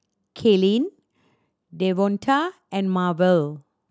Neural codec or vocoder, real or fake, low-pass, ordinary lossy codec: none; real; none; none